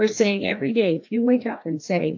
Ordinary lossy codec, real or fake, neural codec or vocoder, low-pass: AAC, 48 kbps; fake; codec, 16 kHz, 1 kbps, FreqCodec, larger model; 7.2 kHz